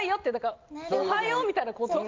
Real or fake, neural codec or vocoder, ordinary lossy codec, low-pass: real; none; Opus, 32 kbps; 7.2 kHz